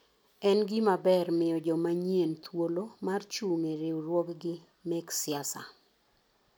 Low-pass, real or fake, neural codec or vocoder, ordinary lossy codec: none; real; none; none